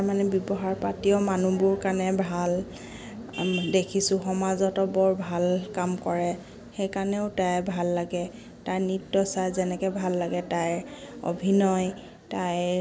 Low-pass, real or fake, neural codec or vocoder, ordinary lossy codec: none; real; none; none